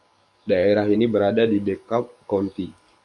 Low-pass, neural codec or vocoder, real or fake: 10.8 kHz; codec, 44.1 kHz, 7.8 kbps, DAC; fake